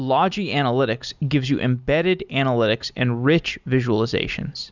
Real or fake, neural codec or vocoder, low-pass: real; none; 7.2 kHz